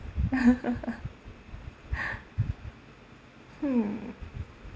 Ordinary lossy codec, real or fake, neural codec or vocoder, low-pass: none; real; none; none